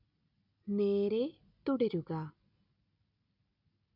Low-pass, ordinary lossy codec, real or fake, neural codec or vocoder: 5.4 kHz; none; real; none